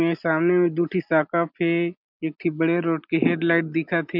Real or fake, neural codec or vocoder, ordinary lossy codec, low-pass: real; none; MP3, 48 kbps; 5.4 kHz